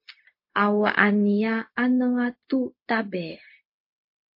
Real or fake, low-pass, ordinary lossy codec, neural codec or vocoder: fake; 5.4 kHz; MP3, 32 kbps; codec, 16 kHz, 0.4 kbps, LongCat-Audio-Codec